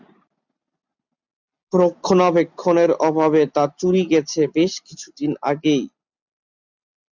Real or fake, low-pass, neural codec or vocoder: real; 7.2 kHz; none